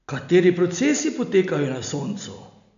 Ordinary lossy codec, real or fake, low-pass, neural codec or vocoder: none; real; 7.2 kHz; none